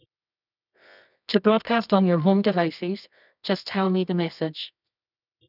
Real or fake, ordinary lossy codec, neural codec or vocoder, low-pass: fake; none; codec, 24 kHz, 0.9 kbps, WavTokenizer, medium music audio release; 5.4 kHz